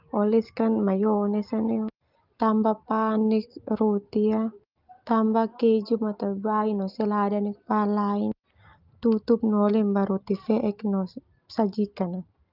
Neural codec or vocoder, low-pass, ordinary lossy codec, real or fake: none; 5.4 kHz; Opus, 32 kbps; real